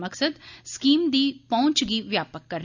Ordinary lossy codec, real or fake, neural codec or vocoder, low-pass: none; real; none; 7.2 kHz